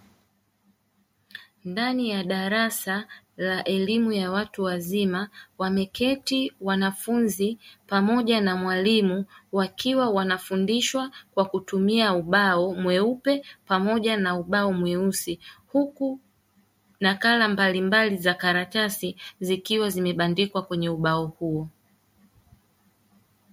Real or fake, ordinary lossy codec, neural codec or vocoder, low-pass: real; MP3, 64 kbps; none; 19.8 kHz